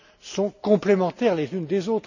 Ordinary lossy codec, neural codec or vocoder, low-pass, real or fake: none; none; 7.2 kHz; real